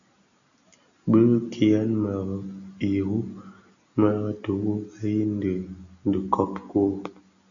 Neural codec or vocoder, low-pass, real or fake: none; 7.2 kHz; real